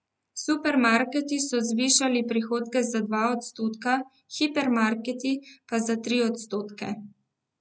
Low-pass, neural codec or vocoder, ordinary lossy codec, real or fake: none; none; none; real